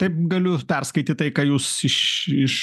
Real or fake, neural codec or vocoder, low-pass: real; none; 14.4 kHz